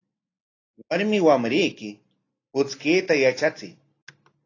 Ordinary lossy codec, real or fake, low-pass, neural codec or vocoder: AAC, 32 kbps; real; 7.2 kHz; none